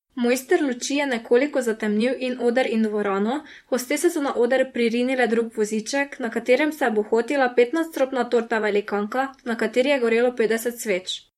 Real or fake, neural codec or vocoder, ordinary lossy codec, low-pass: fake; vocoder, 44.1 kHz, 128 mel bands, Pupu-Vocoder; MP3, 64 kbps; 19.8 kHz